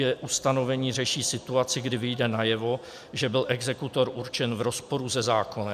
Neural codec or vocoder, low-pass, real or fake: none; 14.4 kHz; real